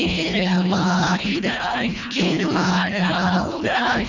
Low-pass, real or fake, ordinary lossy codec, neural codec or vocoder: 7.2 kHz; fake; none; codec, 24 kHz, 1.5 kbps, HILCodec